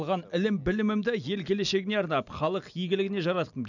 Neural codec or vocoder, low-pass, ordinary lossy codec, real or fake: none; 7.2 kHz; none; real